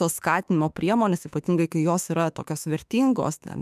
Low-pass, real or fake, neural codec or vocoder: 14.4 kHz; fake; autoencoder, 48 kHz, 32 numbers a frame, DAC-VAE, trained on Japanese speech